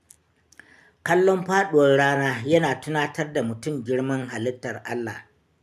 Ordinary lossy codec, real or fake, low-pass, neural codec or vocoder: none; real; 14.4 kHz; none